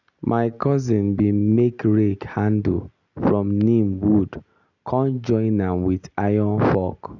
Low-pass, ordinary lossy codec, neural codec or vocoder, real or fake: 7.2 kHz; none; none; real